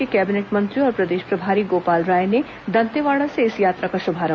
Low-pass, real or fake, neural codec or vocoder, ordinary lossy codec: none; real; none; none